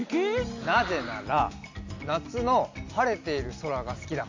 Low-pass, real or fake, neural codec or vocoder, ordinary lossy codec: 7.2 kHz; real; none; AAC, 48 kbps